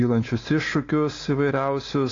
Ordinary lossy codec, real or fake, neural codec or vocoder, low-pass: AAC, 32 kbps; real; none; 7.2 kHz